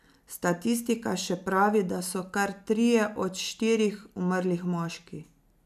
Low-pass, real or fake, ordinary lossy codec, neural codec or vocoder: 14.4 kHz; real; none; none